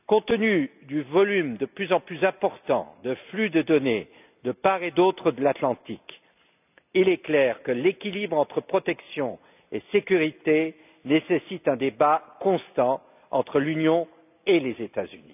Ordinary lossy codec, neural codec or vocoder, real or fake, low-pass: none; none; real; 3.6 kHz